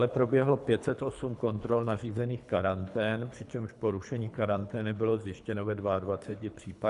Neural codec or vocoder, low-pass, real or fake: codec, 24 kHz, 3 kbps, HILCodec; 10.8 kHz; fake